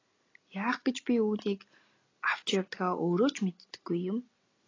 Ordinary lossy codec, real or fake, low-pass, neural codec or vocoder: AAC, 32 kbps; real; 7.2 kHz; none